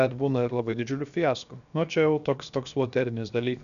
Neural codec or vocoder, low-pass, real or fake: codec, 16 kHz, 0.7 kbps, FocalCodec; 7.2 kHz; fake